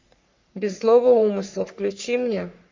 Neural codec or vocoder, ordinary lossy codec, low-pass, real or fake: codec, 44.1 kHz, 3.4 kbps, Pupu-Codec; MP3, 64 kbps; 7.2 kHz; fake